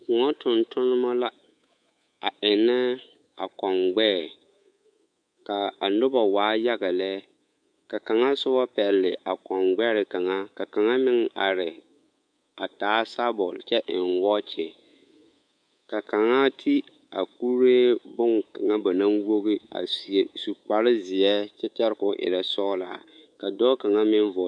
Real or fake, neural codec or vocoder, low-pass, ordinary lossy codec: fake; codec, 24 kHz, 3.1 kbps, DualCodec; 9.9 kHz; MP3, 64 kbps